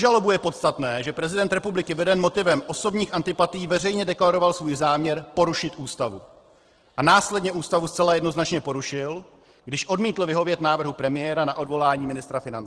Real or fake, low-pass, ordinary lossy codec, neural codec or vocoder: fake; 10.8 kHz; Opus, 16 kbps; vocoder, 44.1 kHz, 128 mel bands every 512 samples, BigVGAN v2